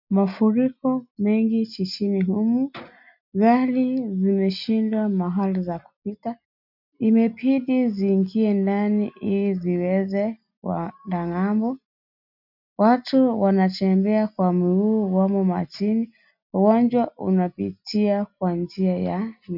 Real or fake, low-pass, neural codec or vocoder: real; 5.4 kHz; none